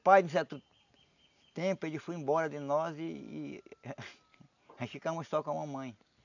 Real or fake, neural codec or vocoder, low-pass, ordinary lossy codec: real; none; 7.2 kHz; none